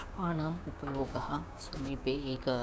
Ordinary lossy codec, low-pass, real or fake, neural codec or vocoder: none; none; fake; codec, 16 kHz, 6 kbps, DAC